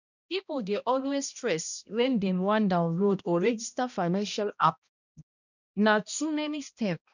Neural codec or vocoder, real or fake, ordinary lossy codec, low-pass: codec, 16 kHz, 1 kbps, X-Codec, HuBERT features, trained on balanced general audio; fake; none; 7.2 kHz